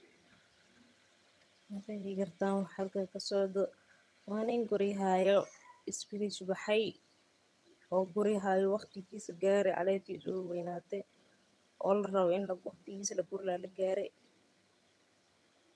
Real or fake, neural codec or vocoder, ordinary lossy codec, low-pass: fake; vocoder, 22.05 kHz, 80 mel bands, HiFi-GAN; none; none